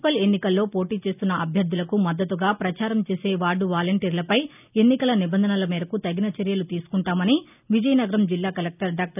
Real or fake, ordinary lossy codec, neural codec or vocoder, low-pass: real; none; none; 3.6 kHz